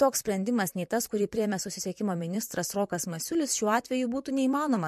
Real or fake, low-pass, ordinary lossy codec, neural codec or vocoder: fake; 14.4 kHz; MP3, 64 kbps; vocoder, 44.1 kHz, 128 mel bands, Pupu-Vocoder